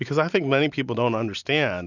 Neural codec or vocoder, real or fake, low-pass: none; real; 7.2 kHz